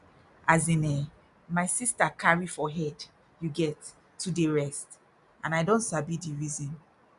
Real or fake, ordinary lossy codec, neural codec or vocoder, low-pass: real; none; none; 10.8 kHz